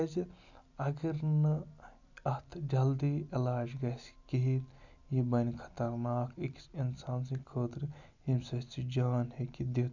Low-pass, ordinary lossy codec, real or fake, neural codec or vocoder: 7.2 kHz; none; real; none